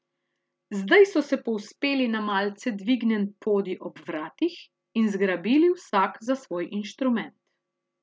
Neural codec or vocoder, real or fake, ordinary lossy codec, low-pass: none; real; none; none